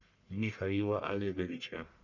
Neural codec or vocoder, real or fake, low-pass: codec, 44.1 kHz, 1.7 kbps, Pupu-Codec; fake; 7.2 kHz